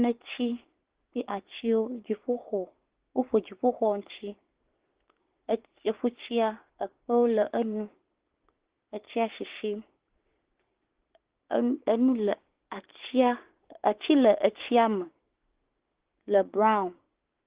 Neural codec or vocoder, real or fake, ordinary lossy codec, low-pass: codec, 16 kHz, 6 kbps, DAC; fake; Opus, 16 kbps; 3.6 kHz